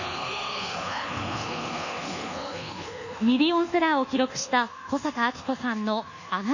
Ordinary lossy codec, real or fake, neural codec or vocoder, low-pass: none; fake; codec, 24 kHz, 1.2 kbps, DualCodec; 7.2 kHz